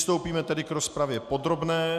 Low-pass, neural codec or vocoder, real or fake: 14.4 kHz; none; real